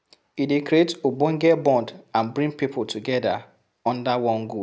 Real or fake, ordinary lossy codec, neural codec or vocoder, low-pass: real; none; none; none